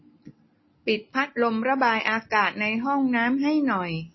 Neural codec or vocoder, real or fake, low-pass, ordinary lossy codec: none; real; 7.2 kHz; MP3, 24 kbps